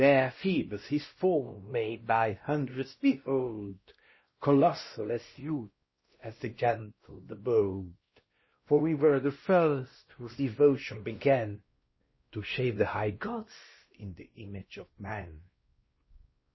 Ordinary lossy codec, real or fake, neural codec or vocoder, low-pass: MP3, 24 kbps; fake; codec, 16 kHz, 1 kbps, X-Codec, WavLM features, trained on Multilingual LibriSpeech; 7.2 kHz